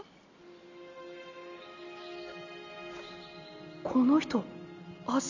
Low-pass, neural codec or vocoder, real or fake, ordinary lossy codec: 7.2 kHz; none; real; none